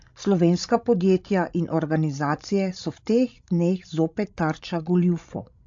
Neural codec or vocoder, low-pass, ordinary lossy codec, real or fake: codec, 16 kHz, 16 kbps, FreqCodec, larger model; 7.2 kHz; AAC, 64 kbps; fake